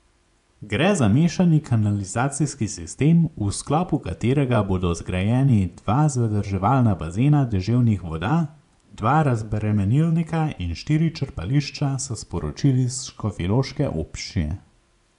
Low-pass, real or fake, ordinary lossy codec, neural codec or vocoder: 10.8 kHz; fake; none; vocoder, 24 kHz, 100 mel bands, Vocos